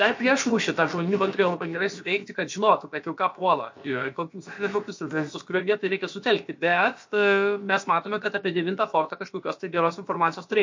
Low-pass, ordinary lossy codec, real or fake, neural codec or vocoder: 7.2 kHz; MP3, 48 kbps; fake; codec, 16 kHz, about 1 kbps, DyCAST, with the encoder's durations